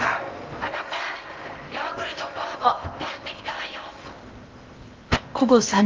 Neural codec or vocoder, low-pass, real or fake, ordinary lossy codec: codec, 16 kHz in and 24 kHz out, 0.8 kbps, FocalCodec, streaming, 65536 codes; 7.2 kHz; fake; Opus, 32 kbps